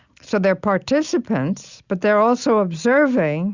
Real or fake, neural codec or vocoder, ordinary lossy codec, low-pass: real; none; Opus, 64 kbps; 7.2 kHz